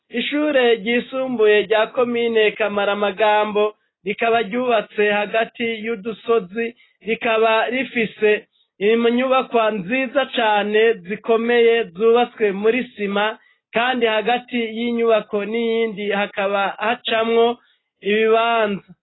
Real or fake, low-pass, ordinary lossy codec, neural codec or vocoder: real; 7.2 kHz; AAC, 16 kbps; none